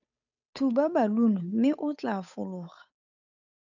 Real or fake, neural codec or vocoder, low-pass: fake; codec, 16 kHz, 8 kbps, FunCodec, trained on Chinese and English, 25 frames a second; 7.2 kHz